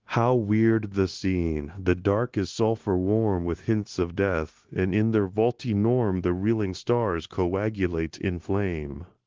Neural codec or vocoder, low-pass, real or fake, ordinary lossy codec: codec, 16 kHz, 0.9 kbps, LongCat-Audio-Codec; 7.2 kHz; fake; Opus, 16 kbps